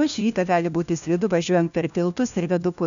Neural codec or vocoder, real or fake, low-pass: codec, 16 kHz, 1 kbps, FunCodec, trained on LibriTTS, 50 frames a second; fake; 7.2 kHz